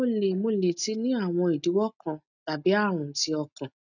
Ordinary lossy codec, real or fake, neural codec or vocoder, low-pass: none; real; none; 7.2 kHz